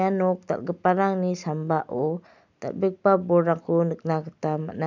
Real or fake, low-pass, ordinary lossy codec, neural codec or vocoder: real; 7.2 kHz; none; none